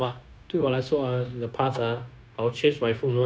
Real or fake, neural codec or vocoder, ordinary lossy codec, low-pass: fake; codec, 16 kHz, 0.9 kbps, LongCat-Audio-Codec; none; none